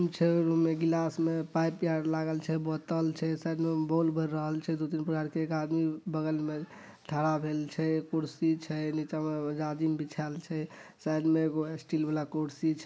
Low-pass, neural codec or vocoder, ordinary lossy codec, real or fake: none; none; none; real